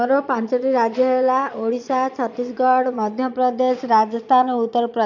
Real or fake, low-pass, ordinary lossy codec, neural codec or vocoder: fake; 7.2 kHz; none; vocoder, 22.05 kHz, 80 mel bands, Vocos